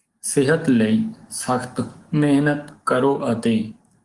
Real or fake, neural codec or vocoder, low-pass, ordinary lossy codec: fake; codec, 44.1 kHz, 7.8 kbps, DAC; 10.8 kHz; Opus, 24 kbps